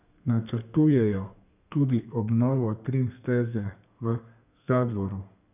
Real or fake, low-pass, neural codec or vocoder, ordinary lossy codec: fake; 3.6 kHz; codec, 32 kHz, 1.9 kbps, SNAC; none